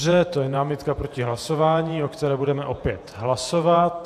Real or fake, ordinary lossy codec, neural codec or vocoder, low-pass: fake; AAC, 96 kbps; vocoder, 48 kHz, 128 mel bands, Vocos; 14.4 kHz